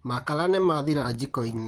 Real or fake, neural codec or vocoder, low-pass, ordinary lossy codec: fake; vocoder, 44.1 kHz, 128 mel bands, Pupu-Vocoder; 19.8 kHz; Opus, 24 kbps